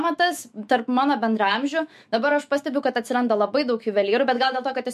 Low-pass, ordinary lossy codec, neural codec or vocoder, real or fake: 14.4 kHz; MP3, 64 kbps; autoencoder, 48 kHz, 128 numbers a frame, DAC-VAE, trained on Japanese speech; fake